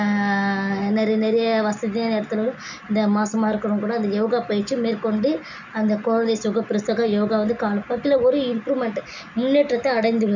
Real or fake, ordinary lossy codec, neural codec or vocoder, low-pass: real; none; none; 7.2 kHz